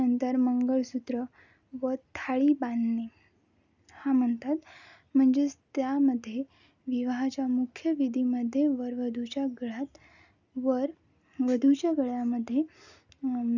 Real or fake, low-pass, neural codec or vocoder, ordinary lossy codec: real; 7.2 kHz; none; none